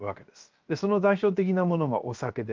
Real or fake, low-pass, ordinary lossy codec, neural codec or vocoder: fake; 7.2 kHz; Opus, 24 kbps; codec, 16 kHz, 0.7 kbps, FocalCodec